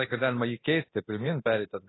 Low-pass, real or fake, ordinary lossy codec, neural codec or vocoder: 7.2 kHz; fake; AAC, 16 kbps; codec, 24 kHz, 0.9 kbps, DualCodec